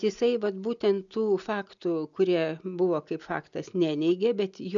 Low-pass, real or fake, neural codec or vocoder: 7.2 kHz; real; none